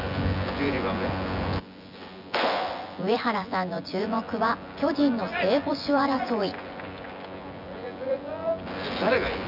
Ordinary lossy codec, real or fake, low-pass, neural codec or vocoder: none; fake; 5.4 kHz; vocoder, 24 kHz, 100 mel bands, Vocos